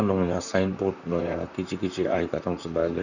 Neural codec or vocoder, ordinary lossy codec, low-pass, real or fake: vocoder, 44.1 kHz, 128 mel bands, Pupu-Vocoder; none; 7.2 kHz; fake